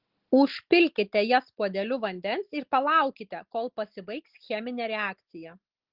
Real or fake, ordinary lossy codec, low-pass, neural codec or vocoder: real; Opus, 16 kbps; 5.4 kHz; none